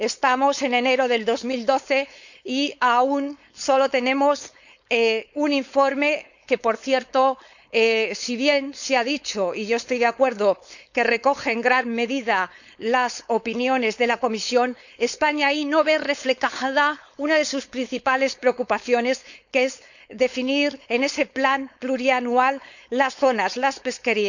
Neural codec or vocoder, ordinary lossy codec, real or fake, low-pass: codec, 16 kHz, 4.8 kbps, FACodec; none; fake; 7.2 kHz